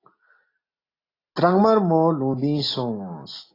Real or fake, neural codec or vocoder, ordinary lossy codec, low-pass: real; none; AAC, 24 kbps; 5.4 kHz